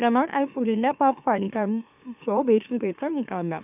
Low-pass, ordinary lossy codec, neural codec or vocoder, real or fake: 3.6 kHz; none; autoencoder, 44.1 kHz, a latent of 192 numbers a frame, MeloTTS; fake